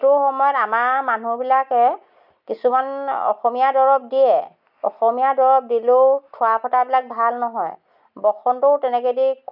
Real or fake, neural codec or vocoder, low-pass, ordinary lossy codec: real; none; 5.4 kHz; none